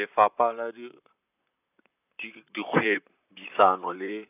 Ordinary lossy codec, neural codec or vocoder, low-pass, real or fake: none; vocoder, 44.1 kHz, 128 mel bands, Pupu-Vocoder; 3.6 kHz; fake